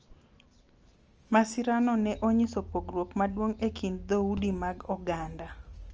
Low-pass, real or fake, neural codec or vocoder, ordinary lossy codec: 7.2 kHz; real; none; Opus, 24 kbps